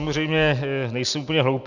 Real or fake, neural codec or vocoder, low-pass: real; none; 7.2 kHz